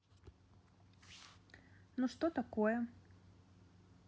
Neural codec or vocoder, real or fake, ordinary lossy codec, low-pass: none; real; none; none